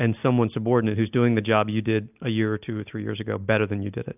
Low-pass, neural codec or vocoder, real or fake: 3.6 kHz; none; real